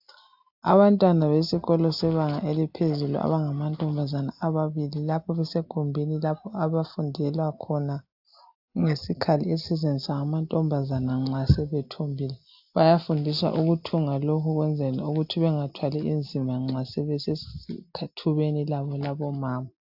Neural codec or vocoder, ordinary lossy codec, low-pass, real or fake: none; AAC, 48 kbps; 5.4 kHz; real